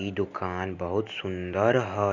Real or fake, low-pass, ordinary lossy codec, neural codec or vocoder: real; 7.2 kHz; none; none